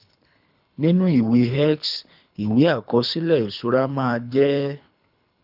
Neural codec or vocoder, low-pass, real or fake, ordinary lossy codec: codec, 24 kHz, 3 kbps, HILCodec; 5.4 kHz; fake; none